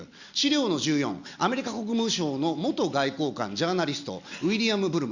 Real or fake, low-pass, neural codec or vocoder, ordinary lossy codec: real; 7.2 kHz; none; Opus, 64 kbps